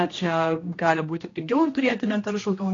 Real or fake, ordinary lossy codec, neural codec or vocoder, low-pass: fake; AAC, 32 kbps; codec, 16 kHz, 1 kbps, X-Codec, HuBERT features, trained on general audio; 7.2 kHz